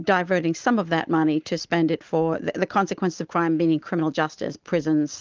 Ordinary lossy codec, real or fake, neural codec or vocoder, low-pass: Opus, 32 kbps; real; none; 7.2 kHz